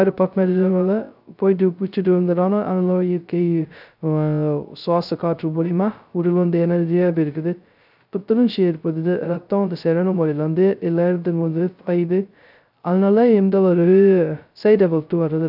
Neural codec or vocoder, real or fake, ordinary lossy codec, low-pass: codec, 16 kHz, 0.2 kbps, FocalCodec; fake; none; 5.4 kHz